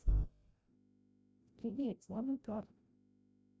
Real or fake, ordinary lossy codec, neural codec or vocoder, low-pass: fake; none; codec, 16 kHz, 0.5 kbps, FreqCodec, larger model; none